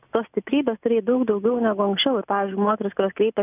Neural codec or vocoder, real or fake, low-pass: none; real; 3.6 kHz